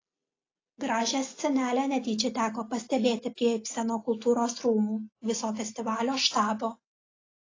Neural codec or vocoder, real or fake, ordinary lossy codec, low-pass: none; real; AAC, 32 kbps; 7.2 kHz